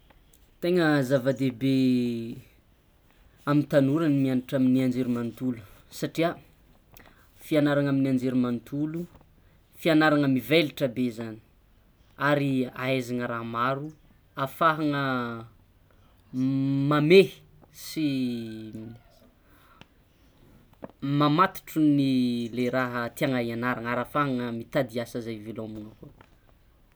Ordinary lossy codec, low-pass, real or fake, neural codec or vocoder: none; none; real; none